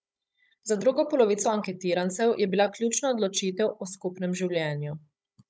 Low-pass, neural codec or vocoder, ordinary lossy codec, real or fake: none; codec, 16 kHz, 16 kbps, FunCodec, trained on Chinese and English, 50 frames a second; none; fake